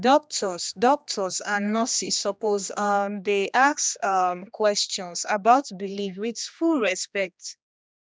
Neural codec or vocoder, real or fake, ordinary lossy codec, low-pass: codec, 16 kHz, 2 kbps, X-Codec, HuBERT features, trained on general audio; fake; none; none